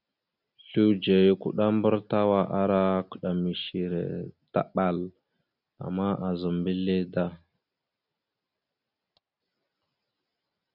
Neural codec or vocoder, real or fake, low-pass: none; real; 5.4 kHz